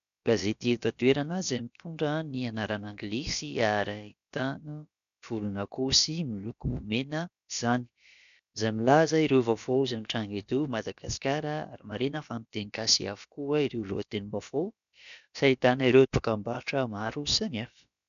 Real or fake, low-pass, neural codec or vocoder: fake; 7.2 kHz; codec, 16 kHz, about 1 kbps, DyCAST, with the encoder's durations